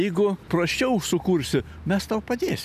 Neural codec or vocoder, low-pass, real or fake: none; 14.4 kHz; real